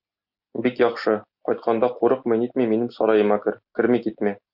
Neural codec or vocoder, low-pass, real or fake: none; 5.4 kHz; real